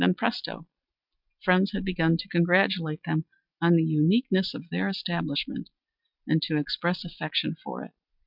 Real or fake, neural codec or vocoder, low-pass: real; none; 5.4 kHz